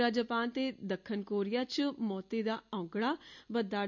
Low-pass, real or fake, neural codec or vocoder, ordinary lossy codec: 7.2 kHz; real; none; none